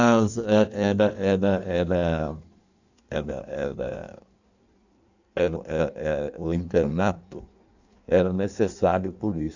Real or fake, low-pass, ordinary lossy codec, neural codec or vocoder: fake; 7.2 kHz; none; codec, 16 kHz in and 24 kHz out, 1.1 kbps, FireRedTTS-2 codec